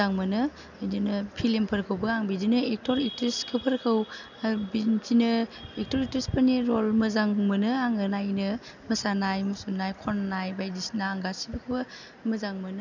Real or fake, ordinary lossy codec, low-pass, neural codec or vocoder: real; none; 7.2 kHz; none